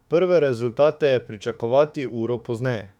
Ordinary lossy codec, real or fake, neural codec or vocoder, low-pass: none; fake; autoencoder, 48 kHz, 32 numbers a frame, DAC-VAE, trained on Japanese speech; 19.8 kHz